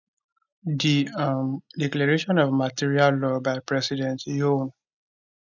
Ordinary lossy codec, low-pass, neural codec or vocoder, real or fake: none; 7.2 kHz; none; real